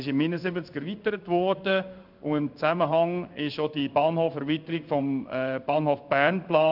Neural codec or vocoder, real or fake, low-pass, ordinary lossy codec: codec, 16 kHz in and 24 kHz out, 1 kbps, XY-Tokenizer; fake; 5.4 kHz; none